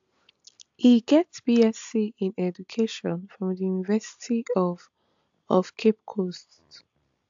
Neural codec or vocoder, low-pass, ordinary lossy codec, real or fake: none; 7.2 kHz; AAC, 64 kbps; real